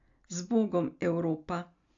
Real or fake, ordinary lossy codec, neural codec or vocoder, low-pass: real; AAC, 64 kbps; none; 7.2 kHz